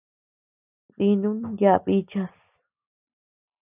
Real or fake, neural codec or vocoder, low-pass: real; none; 3.6 kHz